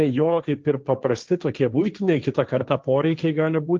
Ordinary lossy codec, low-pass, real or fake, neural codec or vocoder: Opus, 32 kbps; 7.2 kHz; fake; codec, 16 kHz, 1.1 kbps, Voila-Tokenizer